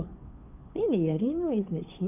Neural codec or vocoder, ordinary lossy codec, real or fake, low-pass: codec, 16 kHz, 2 kbps, FunCodec, trained on Chinese and English, 25 frames a second; none; fake; 3.6 kHz